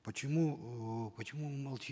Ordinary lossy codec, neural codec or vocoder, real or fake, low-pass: none; none; real; none